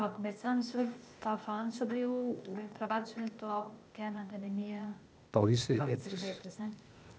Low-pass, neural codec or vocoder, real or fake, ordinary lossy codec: none; codec, 16 kHz, 0.8 kbps, ZipCodec; fake; none